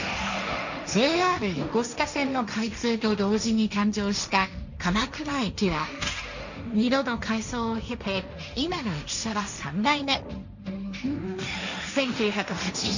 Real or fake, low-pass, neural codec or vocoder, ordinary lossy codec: fake; 7.2 kHz; codec, 16 kHz, 1.1 kbps, Voila-Tokenizer; none